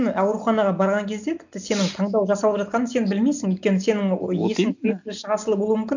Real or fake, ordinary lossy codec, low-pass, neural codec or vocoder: real; none; 7.2 kHz; none